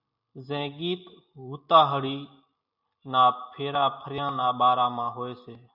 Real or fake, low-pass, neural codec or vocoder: real; 5.4 kHz; none